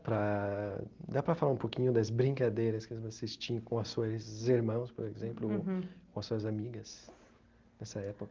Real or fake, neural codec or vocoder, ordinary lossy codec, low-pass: real; none; Opus, 32 kbps; 7.2 kHz